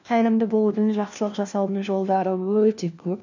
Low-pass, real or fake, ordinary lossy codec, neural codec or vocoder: 7.2 kHz; fake; none; codec, 16 kHz, 1 kbps, FunCodec, trained on LibriTTS, 50 frames a second